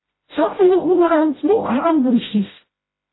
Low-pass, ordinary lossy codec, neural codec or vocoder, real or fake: 7.2 kHz; AAC, 16 kbps; codec, 16 kHz, 0.5 kbps, FreqCodec, smaller model; fake